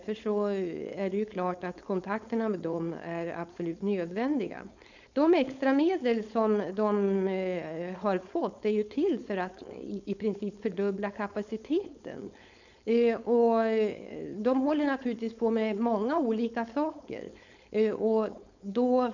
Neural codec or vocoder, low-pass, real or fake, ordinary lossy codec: codec, 16 kHz, 4.8 kbps, FACodec; 7.2 kHz; fake; none